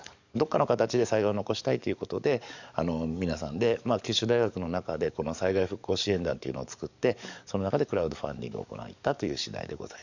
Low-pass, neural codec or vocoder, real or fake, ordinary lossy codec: 7.2 kHz; codec, 44.1 kHz, 7.8 kbps, DAC; fake; none